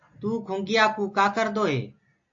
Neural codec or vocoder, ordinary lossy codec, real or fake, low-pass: none; AAC, 48 kbps; real; 7.2 kHz